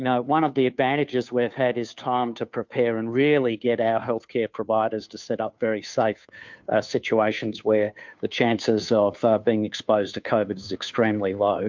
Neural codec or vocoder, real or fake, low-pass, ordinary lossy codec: codec, 16 kHz, 2 kbps, FunCodec, trained on Chinese and English, 25 frames a second; fake; 7.2 kHz; MP3, 64 kbps